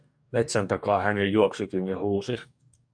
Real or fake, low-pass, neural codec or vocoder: fake; 9.9 kHz; codec, 44.1 kHz, 2.6 kbps, DAC